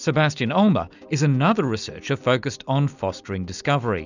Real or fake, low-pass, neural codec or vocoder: real; 7.2 kHz; none